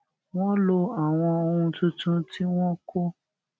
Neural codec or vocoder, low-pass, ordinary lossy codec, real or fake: none; none; none; real